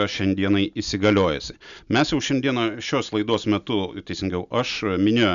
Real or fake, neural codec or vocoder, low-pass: real; none; 7.2 kHz